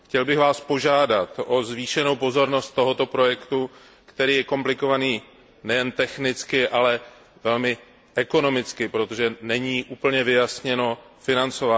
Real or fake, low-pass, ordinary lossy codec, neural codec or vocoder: real; none; none; none